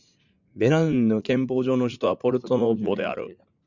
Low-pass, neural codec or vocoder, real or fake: 7.2 kHz; vocoder, 44.1 kHz, 80 mel bands, Vocos; fake